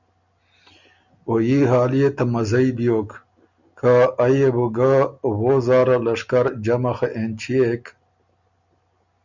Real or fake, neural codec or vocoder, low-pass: real; none; 7.2 kHz